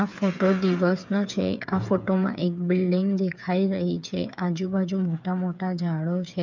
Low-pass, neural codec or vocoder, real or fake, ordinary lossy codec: 7.2 kHz; codec, 16 kHz, 8 kbps, FreqCodec, smaller model; fake; none